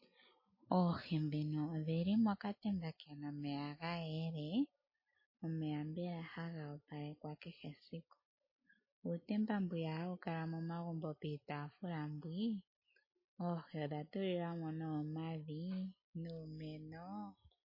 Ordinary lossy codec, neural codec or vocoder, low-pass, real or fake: MP3, 24 kbps; none; 5.4 kHz; real